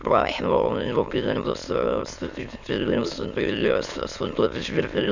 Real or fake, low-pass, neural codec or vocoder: fake; 7.2 kHz; autoencoder, 22.05 kHz, a latent of 192 numbers a frame, VITS, trained on many speakers